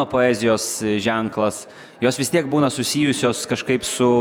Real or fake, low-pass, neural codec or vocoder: fake; 19.8 kHz; vocoder, 48 kHz, 128 mel bands, Vocos